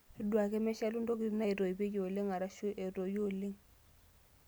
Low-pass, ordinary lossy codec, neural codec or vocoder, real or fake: none; none; none; real